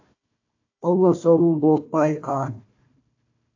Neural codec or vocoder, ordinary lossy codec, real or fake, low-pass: codec, 16 kHz, 1 kbps, FunCodec, trained on Chinese and English, 50 frames a second; AAC, 48 kbps; fake; 7.2 kHz